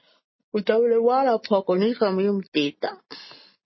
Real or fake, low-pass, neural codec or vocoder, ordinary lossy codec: real; 7.2 kHz; none; MP3, 24 kbps